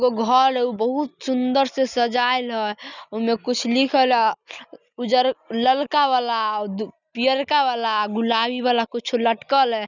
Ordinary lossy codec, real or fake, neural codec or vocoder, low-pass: none; real; none; 7.2 kHz